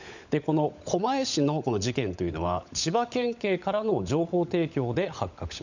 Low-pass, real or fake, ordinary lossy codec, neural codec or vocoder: 7.2 kHz; fake; none; codec, 16 kHz, 16 kbps, FunCodec, trained on LibriTTS, 50 frames a second